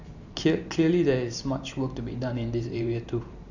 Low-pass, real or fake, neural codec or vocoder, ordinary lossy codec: 7.2 kHz; fake; vocoder, 44.1 kHz, 128 mel bands every 512 samples, BigVGAN v2; none